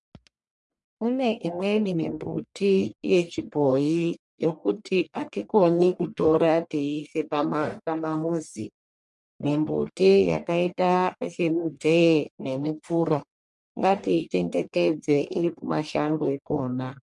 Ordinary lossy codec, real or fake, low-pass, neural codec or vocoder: MP3, 64 kbps; fake; 10.8 kHz; codec, 44.1 kHz, 1.7 kbps, Pupu-Codec